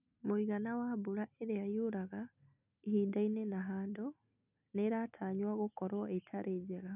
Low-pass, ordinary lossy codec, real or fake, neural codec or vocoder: 3.6 kHz; none; real; none